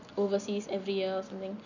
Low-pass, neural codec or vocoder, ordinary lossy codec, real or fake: 7.2 kHz; none; none; real